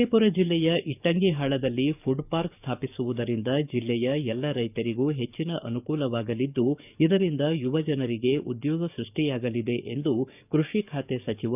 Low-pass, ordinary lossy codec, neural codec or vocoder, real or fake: 3.6 kHz; none; codec, 44.1 kHz, 7.8 kbps, DAC; fake